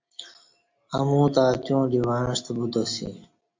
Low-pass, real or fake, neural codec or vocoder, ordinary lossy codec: 7.2 kHz; real; none; MP3, 64 kbps